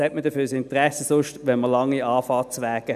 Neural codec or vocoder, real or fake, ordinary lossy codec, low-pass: none; real; none; 14.4 kHz